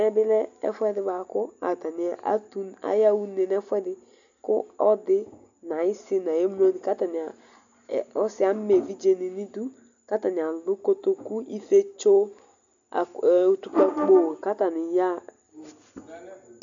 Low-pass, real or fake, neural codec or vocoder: 7.2 kHz; real; none